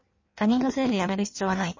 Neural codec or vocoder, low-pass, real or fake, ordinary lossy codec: codec, 16 kHz in and 24 kHz out, 1.1 kbps, FireRedTTS-2 codec; 7.2 kHz; fake; MP3, 32 kbps